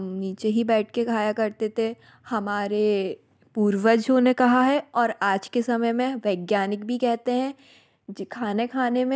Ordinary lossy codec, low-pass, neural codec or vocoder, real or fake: none; none; none; real